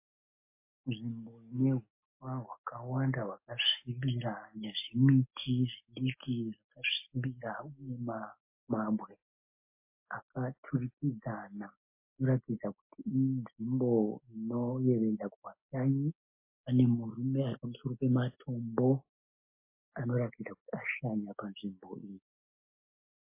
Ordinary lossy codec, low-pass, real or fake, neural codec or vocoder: MP3, 24 kbps; 3.6 kHz; real; none